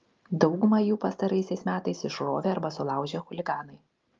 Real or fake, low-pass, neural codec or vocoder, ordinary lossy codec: real; 7.2 kHz; none; Opus, 32 kbps